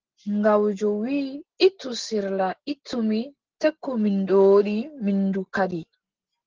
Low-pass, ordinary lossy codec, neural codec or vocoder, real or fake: 7.2 kHz; Opus, 16 kbps; none; real